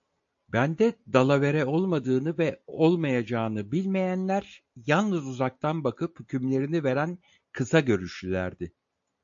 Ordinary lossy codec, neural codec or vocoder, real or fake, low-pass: AAC, 64 kbps; none; real; 7.2 kHz